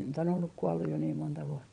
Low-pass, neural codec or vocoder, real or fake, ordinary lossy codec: 9.9 kHz; vocoder, 22.05 kHz, 80 mel bands, WaveNeXt; fake; none